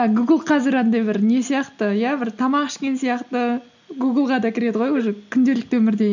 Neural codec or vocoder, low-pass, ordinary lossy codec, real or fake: none; 7.2 kHz; none; real